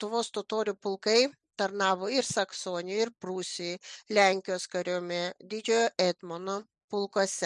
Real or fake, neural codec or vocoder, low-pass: real; none; 10.8 kHz